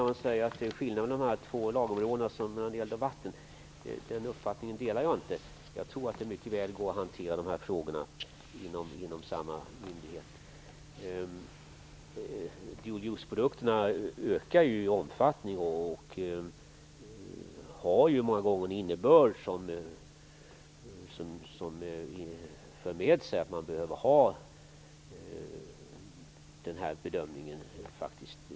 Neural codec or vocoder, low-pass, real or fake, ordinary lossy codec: none; none; real; none